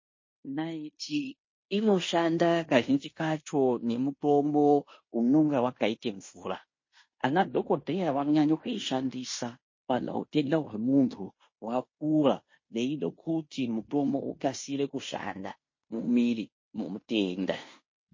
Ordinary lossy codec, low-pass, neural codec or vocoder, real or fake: MP3, 32 kbps; 7.2 kHz; codec, 16 kHz in and 24 kHz out, 0.9 kbps, LongCat-Audio-Codec, fine tuned four codebook decoder; fake